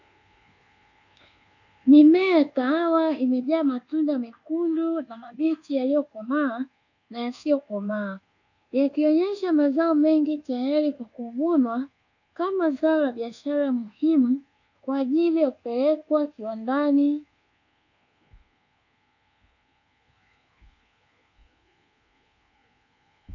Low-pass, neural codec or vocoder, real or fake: 7.2 kHz; codec, 24 kHz, 1.2 kbps, DualCodec; fake